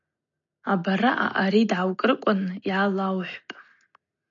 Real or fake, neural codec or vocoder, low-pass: real; none; 7.2 kHz